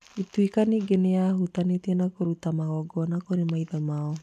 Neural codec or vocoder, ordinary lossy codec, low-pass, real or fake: none; none; 14.4 kHz; real